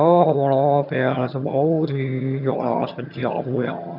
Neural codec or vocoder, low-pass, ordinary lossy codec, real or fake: vocoder, 22.05 kHz, 80 mel bands, HiFi-GAN; 5.4 kHz; none; fake